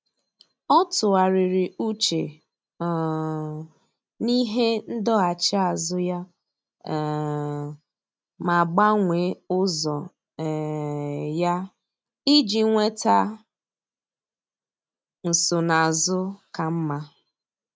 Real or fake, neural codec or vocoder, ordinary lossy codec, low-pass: real; none; none; none